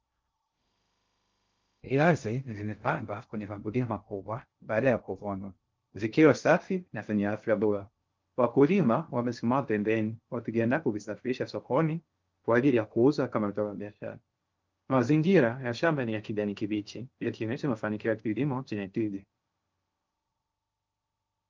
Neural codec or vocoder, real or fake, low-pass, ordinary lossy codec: codec, 16 kHz in and 24 kHz out, 0.6 kbps, FocalCodec, streaming, 2048 codes; fake; 7.2 kHz; Opus, 24 kbps